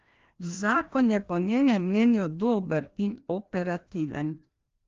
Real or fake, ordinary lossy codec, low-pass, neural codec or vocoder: fake; Opus, 32 kbps; 7.2 kHz; codec, 16 kHz, 1 kbps, FreqCodec, larger model